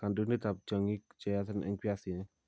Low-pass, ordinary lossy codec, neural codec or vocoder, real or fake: none; none; none; real